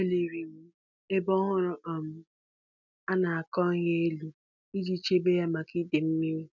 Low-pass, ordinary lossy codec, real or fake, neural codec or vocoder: 7.2 kHz; none; real; none